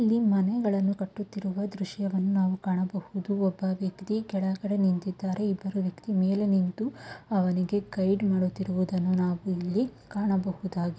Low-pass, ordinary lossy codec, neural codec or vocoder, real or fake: none; none; none; real